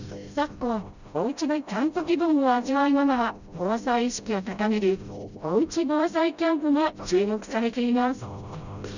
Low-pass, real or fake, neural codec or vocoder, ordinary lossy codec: 7.2 kHz; fake; codec, 16 kHz, 0.5 kbps, FreqCodec, smaller model; none